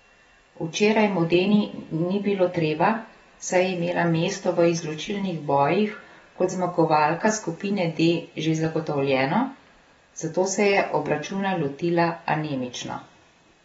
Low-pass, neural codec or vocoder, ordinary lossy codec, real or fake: 19.8 kHz; none; AAC, 24 kbps; real